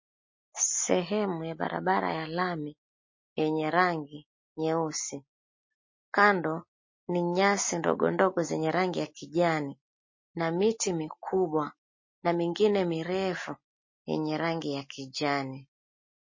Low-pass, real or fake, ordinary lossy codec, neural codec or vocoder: 7.2 kHz; real; MP3, 32 kbps; none